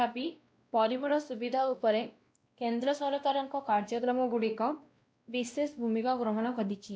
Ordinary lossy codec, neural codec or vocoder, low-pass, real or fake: none; codec, 16 kHz, 1 kbps, X-Codec, WavLM features, trained on Multilingual LibriSpeech; none; fake